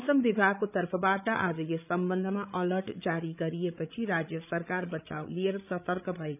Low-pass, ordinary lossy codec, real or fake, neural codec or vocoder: 3.6 kHz; none; fake; codec, 16 kHz, 16 kbps, FreqCodec, larger model